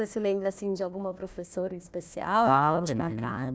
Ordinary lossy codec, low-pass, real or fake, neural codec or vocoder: none; none; fake; codec, 16 kHz, 1 kbps, FunCodec, trained on Chinese and English, 50 frames a second